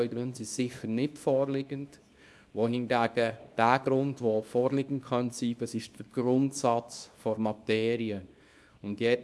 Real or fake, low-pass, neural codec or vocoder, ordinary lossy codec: fake; none; codec, 24 kHz, 0.9 kbps, WavTokenizer, small release; none